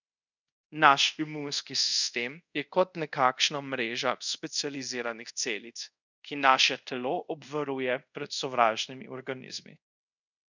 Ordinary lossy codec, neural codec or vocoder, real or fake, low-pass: none; codec, 24 kHz, 0.5 kbps, DualCodec; fake; 7.2 kHz